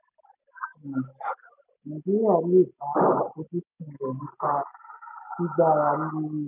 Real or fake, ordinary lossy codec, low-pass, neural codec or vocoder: real; none; 3.6 kHz; none